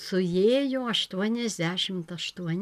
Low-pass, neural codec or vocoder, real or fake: 14.4 kHz; none; real